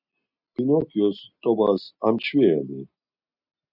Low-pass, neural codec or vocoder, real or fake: 5.4 kHz; none; real